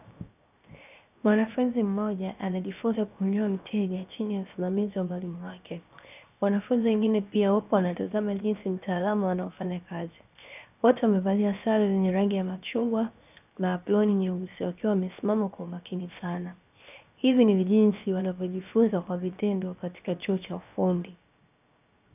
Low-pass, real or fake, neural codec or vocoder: 3.6 kHz; fake; codec, 16 kHz, 0.7 kbps, FocalCodec